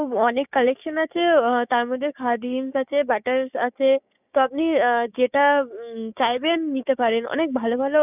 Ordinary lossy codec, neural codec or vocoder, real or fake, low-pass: none; codec, 16 kHz, 6 kbps, DAC; fake; 3.6 kHz